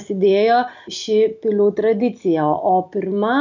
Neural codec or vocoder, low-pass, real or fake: none; 7.2 kHz; real